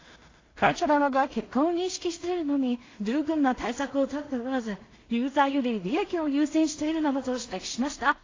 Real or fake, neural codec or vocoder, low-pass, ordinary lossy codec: fake; codec, 16 kHz in and 24 kHz out, 0.4 kbps, LongCat-Audio-Codec, two codebook decoder; 7.2 kHz; AAC, 32 kbps